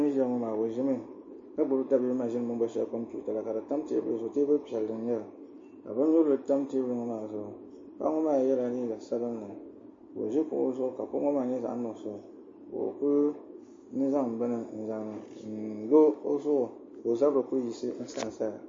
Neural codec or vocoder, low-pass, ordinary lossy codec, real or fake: none; 7.2 kHz; MP3, 32 kbps; real